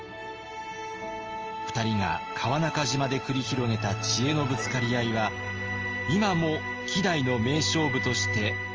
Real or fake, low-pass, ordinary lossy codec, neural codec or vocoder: real; 7.2 kHz; Opus, 24 kbps; none